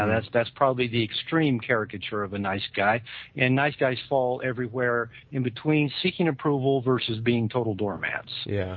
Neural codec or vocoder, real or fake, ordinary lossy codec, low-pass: none; real; MP3, 32 kbps; 7.2 kHz